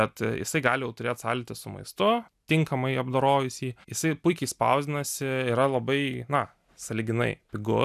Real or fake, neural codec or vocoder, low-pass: real; none; 14.4 kHz